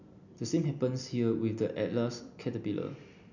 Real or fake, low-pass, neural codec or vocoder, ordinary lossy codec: real; 7.2 kHz; none; none